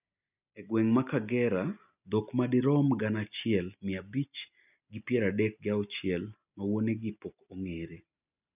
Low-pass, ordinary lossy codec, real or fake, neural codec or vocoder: 3.6 kHz; none; real; none